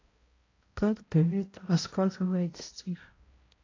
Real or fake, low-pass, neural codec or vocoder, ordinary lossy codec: fake; 7.2 kHz; codec, 16 kHz, 0.5 kbps, X-Codec, HuBERT features, trained on balanced general audio; AAC, 32 kbps